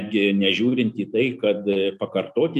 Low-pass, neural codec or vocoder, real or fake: 14.4 kHz; none; real